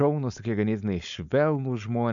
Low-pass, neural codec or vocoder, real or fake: 7.2 kHz; codec, 16 kHz, 4.8 kbps, FACodec; fake